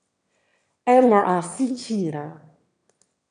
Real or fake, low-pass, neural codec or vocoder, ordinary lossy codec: fake; 9.9 kHz; autoencoder, 22.05 kHz, a latent of 192 numbers a frame, VITS, trained on one speaker; AAC, 64 kbps